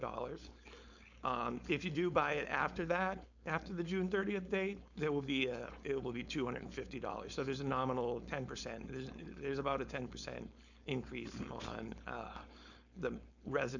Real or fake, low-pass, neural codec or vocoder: fake; 7.2 kHz; codec, 16 kHz, 4.8 kbps, FACodec